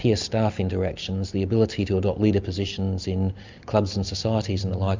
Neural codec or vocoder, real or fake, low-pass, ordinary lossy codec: none; real; 7.2 kHz; MP3, 64 kbps